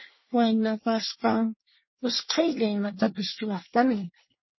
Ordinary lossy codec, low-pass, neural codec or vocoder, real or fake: MP3, 24 kbps; 7.2 kHz; codec, 24 kHz, 1 kbps, SNAC; fake